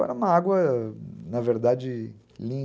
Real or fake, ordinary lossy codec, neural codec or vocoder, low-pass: real; none; none; none